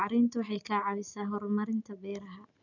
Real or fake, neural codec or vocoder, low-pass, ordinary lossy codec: real; none; 7.2 kHz; none